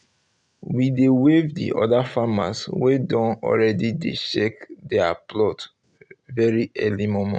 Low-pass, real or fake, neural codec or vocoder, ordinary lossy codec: 9.9 kHz; real; none; none